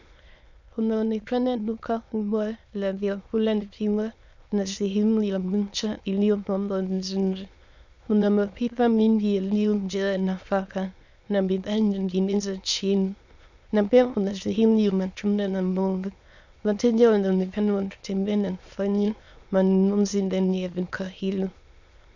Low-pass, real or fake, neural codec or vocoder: 7.2 kHz; fake; autoencoder, 22.05 kHz, a latent of 192 numbers a frame, VITS, trained on many speakers